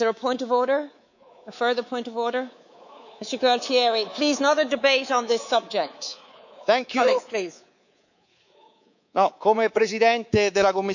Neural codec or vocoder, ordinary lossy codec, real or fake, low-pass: codec, 24 kHz, 3.1 kbps, DualCodec; none; fake; 7.2 kHz